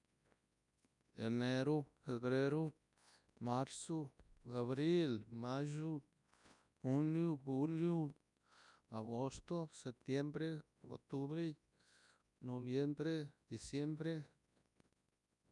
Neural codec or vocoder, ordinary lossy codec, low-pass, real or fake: codec, 24 kHz, 0.9 kbps, WavTokenizer, large speech release; none; 10.8 kHz; fake